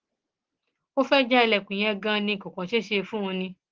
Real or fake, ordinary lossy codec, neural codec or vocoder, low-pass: real; Opus, 16 kbps; none; 7.2 kHz